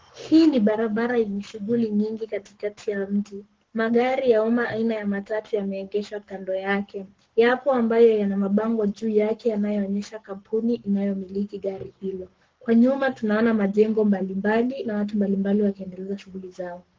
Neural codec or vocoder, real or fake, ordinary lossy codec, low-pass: codec, 44.1 kHz, 7.8 kbps, Pupu-Codec; fake; Opus, 16 kbps; 7.2 kHz